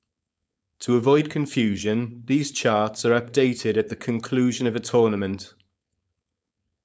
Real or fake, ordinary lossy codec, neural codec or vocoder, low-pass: fake; none; codec, 16 kHz, 4.8 kbps, FACodec; none